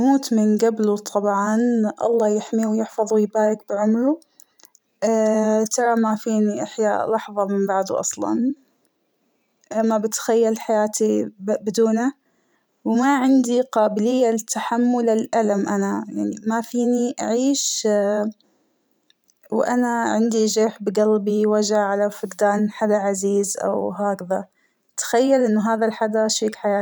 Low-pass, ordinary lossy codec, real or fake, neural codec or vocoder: none; none; fake; vocoder, 44.1 kHz, 128 mel bands every 512 samples, BigVGAN v2